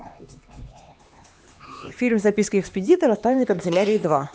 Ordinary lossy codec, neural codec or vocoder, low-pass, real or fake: none; codec, 16 kHz, 2 kbps, X-Codec, HuBERT features, trained on LibriSpeech; none; fake